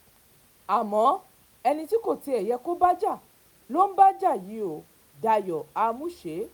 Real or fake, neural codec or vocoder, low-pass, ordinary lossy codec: real; none; none; none